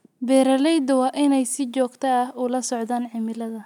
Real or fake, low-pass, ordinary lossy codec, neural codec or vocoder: real; 19.8 kHz; none; none